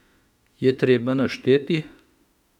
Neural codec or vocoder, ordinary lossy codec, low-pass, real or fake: autoencoder, 48 kHz, 32 numbers a frame, DAC-VAE, trained on Japanese speech; none; 19.8 kHz; fake